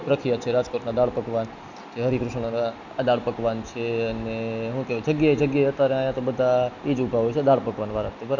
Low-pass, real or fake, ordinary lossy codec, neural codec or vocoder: 7.2 kHz; real; none; none